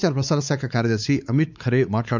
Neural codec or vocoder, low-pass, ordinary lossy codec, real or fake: codec, 16 kHz, 4 kbps, X-Codec, WavLM features, trained on Multilingual LibriSpeech; 7.2 kHz; none; fake